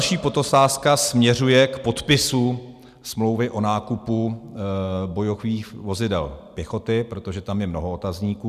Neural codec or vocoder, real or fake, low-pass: none; real; 14.4 kHz